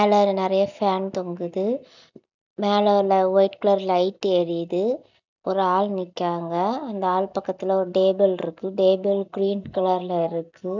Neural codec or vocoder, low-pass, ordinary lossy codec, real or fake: none; 7.2 kHz; none; real